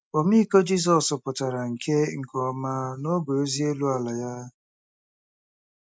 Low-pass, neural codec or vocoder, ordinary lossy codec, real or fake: none; none; none; real